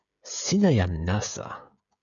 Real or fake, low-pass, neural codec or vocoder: fake; 7.2 kHz; codec, 16 kHz, 16 kbps, FreqCodec, smaller model